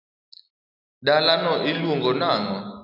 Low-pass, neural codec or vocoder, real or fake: 5.4 kHz; none; real